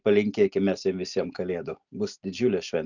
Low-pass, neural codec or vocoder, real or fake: 7.2 kHz; none; real